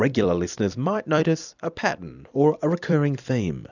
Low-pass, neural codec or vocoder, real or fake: 7.2 kHz; vocoder, 44.1 kHz, 128 mel bands every 256 samples, BigVGAN v2; fake